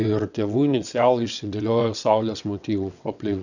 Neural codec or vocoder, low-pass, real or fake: vocoder, 22.05 kHz, 80 mel bands, WaveNeXt; 7.2 kHz; fake